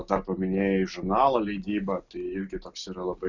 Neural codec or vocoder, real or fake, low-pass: none; real; 7.2 kHz